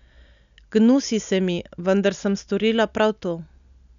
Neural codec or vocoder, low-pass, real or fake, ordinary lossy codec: none; 7.2 kHz; real; none